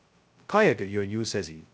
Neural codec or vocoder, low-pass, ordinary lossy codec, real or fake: codec, 16 kHz, 0.2 kbps, FocalCodec; none; none; fake